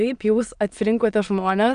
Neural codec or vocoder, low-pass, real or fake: autoencoder, 22.05 kHz, a latent of 192 numbers a frame, VITS, trained on many speakers; 9.9 kHz; fake